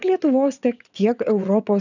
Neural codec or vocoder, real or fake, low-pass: vocoder, 22.05 kHz, 80 mel bands, WaveNeXt; fake; 7.2 kHz